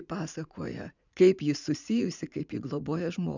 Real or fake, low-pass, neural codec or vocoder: fake; 7.2 kHz; vocoder, 44.1 kHz, 80 mel bands, Vocos